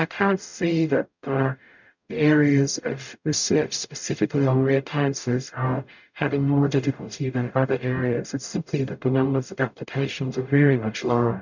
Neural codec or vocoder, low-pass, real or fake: codec, 44.1 kHz, 0.9 kbps, DAC; 7.2 kHz; fake